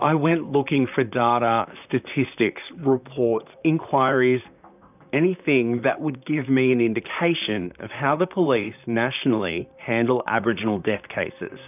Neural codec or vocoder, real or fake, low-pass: vocoder, 44.1 kHz, 128 mel bands, Pupu-Vocoder; fake; 3.6 kHz